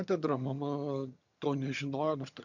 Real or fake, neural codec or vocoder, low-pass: fake; vocoder, 22.05 kHz, 80 mel bands, HiFi-GAN; 7.2 kHz